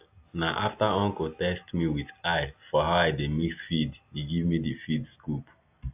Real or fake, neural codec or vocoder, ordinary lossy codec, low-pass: real; none; AAC, 32 kbps; 3.6 kHz